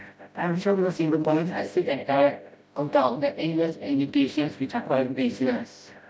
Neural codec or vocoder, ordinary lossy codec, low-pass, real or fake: codec, 16 kHz, 0.5 kbps, FreqCodec, smaller model; none; none; fake